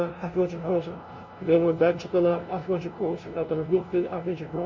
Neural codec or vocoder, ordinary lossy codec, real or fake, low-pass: codec, 16 kHz, 0.5 kbps, FunCodec, trained on LibriTTS, 25 frames a second; MP3, 32 kbps; fake; 7.2 kHz